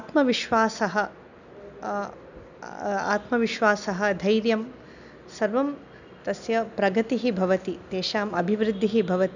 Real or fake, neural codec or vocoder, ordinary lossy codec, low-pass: real; none; none; 7.2 kHz